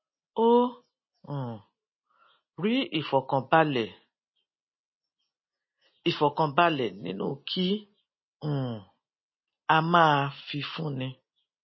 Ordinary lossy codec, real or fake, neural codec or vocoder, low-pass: MP3, 24 kbps; real; none; 7.2 kHz